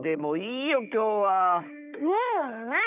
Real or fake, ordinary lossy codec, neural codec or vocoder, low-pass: fake; none; codec, 16 kHz, 4 kbps, FunCodec, trained on Chinese and English, 50 frames a second; 3.6 kHz